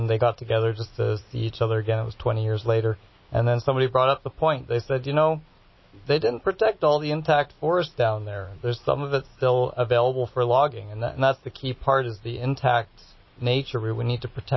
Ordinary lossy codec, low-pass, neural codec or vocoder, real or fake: MP3, 24 kbps; 7.2 kHz; none; real